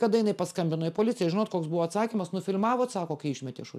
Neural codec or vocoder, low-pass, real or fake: none; 14.4 kHz; real